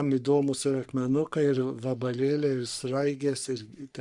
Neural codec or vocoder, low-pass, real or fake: codec, 44.1 kHz, 3.4 kbps, Pupu-Codec; 10.8 kHz; fake